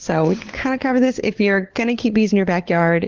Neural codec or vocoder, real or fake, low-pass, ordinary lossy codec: codec, 16 kHz, 4 kbps, FunCodec, trained on LibriTTS, 50 frames a second; fake; 7.2 kHz; Opus, 16 kbps